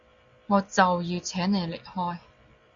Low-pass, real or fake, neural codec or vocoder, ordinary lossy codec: 7.2 kHz; real; none; Opus, 64 kbps